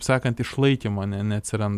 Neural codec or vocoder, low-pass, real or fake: none; 14.4 kHz; real